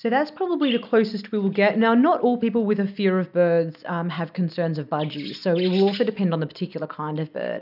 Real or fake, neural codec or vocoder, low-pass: real; none; 5.4 kHz